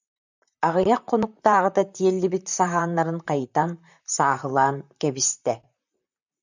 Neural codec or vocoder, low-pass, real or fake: vocoder, 44.1 kHz, 128 mel bands, Pupu-Vocoder; 7.2 kHz; fake